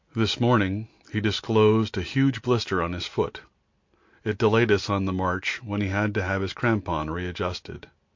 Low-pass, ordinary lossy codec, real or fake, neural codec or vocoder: 7.2 kHz; MP3, 48 kbps; real; none